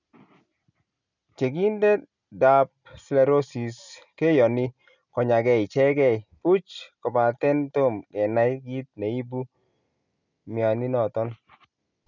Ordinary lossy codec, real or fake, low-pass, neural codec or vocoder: none; real; 7.2 kHz; none